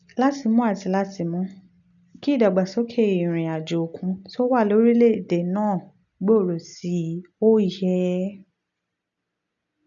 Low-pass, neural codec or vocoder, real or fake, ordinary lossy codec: 7.2 kHz; none; real; none